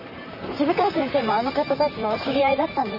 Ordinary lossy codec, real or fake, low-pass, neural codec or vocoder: none; fake; 5.4 kHz; vocoder, 44.1 kHz, 128 mel bands, Pupu-Vocoder